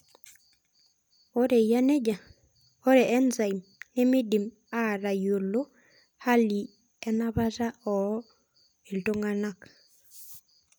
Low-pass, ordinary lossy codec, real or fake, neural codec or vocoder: none; none; real; none